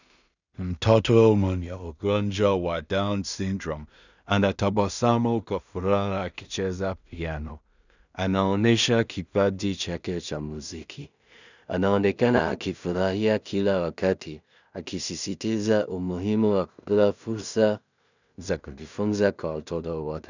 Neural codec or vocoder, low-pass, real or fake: codec, 16 kHz in and 24 kHz out, 0.4 kbps, LongCat-Audio-Codec, two codebook decoder; 7.2 kHz; fake